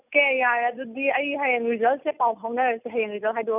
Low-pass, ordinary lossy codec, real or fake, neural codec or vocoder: 3.6 kHz; none; real; none